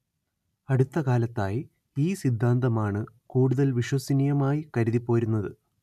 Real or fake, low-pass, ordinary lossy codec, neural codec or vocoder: real; 14.4 kHz; none; none